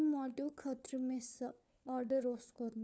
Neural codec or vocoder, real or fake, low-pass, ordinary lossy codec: codec, 16 kHz, 4 kbps, FunCodec, trained on LibriTTS, 50 frames a second; fake; none; none